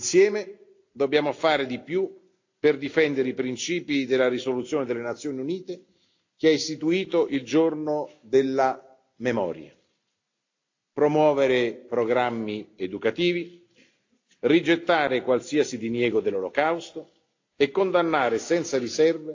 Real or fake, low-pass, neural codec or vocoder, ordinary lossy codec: real; 7.2 kHz; none; AAC, 48 kbps